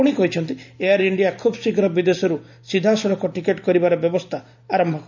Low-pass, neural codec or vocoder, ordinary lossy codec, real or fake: 7.2 kHz; none; none; real